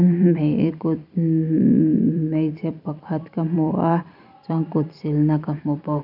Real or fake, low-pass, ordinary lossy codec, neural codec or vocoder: fake; 5.4 kHz; none; vocoder, 44.1 kHz, 128 mel bands every 256 samples, BigVGAN v2